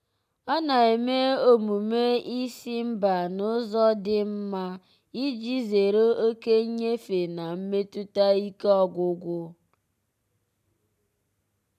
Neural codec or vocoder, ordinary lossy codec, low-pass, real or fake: none; none; 14.4 kHz; real